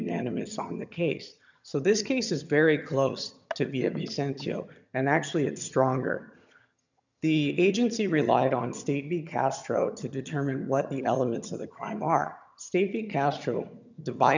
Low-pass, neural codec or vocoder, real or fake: 7.2 kHz; vocoder, 22.05 kHz, 80 mel bands, HiFi-GAN; fake